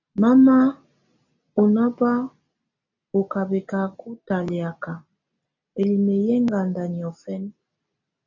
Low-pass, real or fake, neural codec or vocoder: 7.2 kHz; real; none